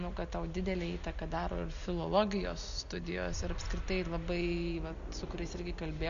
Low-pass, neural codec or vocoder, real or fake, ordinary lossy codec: 7.2 kHz; none; real; AAC, 96 kbps